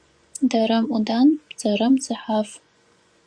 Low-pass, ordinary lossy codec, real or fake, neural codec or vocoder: 9.9 kHz; Opus, 64 kbps; fake; vocoder, 24 kHz, 100 mel bands, Vocos